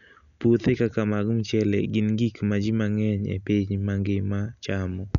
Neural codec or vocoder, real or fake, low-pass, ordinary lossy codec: none; real; 7.2 kHz; none